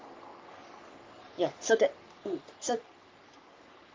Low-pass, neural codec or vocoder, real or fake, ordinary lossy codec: 7.2 kHz; codec, 44.1 kHz, 3.4 kbps, Pupu-Codec; fake; Opus, 24 kbps